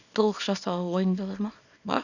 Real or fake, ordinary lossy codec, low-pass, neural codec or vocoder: fake; none; 7.2 kHz; codec, 24 kHz, 0.9 kbps, WavTokenizer, small release